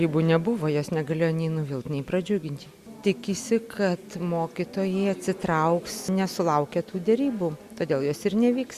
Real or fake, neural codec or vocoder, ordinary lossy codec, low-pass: real; none; Opus, 64 kbps; 14.4 kHz